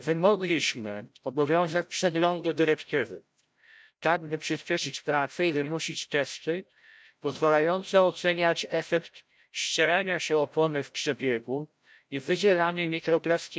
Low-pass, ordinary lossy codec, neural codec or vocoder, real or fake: none; none; codec, 16 kHz, 0.5 kbps, FreqCodec, larger model; fake